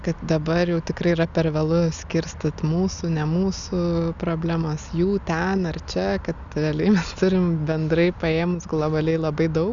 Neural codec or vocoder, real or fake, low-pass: none; real; 7.2 kHz